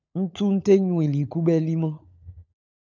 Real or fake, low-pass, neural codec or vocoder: fake; 7.2 kHz; codec, 16 kHz, 16 kbps, FunCodec, trained on LibriTTS, 50 frames a second